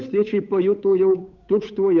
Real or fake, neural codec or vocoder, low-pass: fake; codec, 16 kHz, 8 kbps, FunCodec, trained on Chinese and English, 25 frames a second; 7.2 kHz